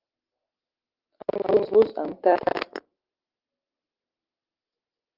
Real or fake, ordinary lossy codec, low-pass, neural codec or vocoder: real; Opus, 16 kbps; 5.4 kHz; none